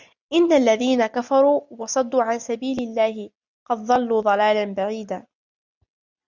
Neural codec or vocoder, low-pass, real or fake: none; 7.2 kHz; real